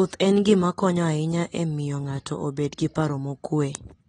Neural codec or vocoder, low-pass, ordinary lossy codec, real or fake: none; 9.9 kHz; AAC, 32 kbps; real